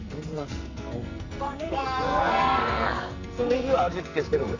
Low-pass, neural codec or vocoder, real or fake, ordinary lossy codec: 7.2 kHz; codec, 44.1 kHz, 2.6 kbps, SNAC; fake; Opus, 64 kbps